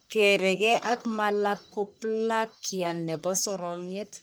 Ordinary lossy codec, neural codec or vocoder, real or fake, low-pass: none; codec, 44.1 kHz, 1.7 kbps, Pupu-Codec; fake; none